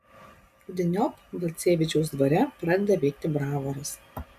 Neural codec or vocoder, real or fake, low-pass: none; real; 14.4 kHz